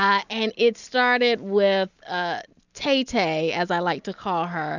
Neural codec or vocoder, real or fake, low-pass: none; real; 7.2 kHz